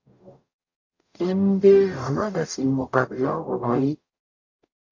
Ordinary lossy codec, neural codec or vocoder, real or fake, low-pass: AAC, 48 kbps; codec, 44.1 kHz, 0.9 kbps, DAC; fake; 7.2 kHz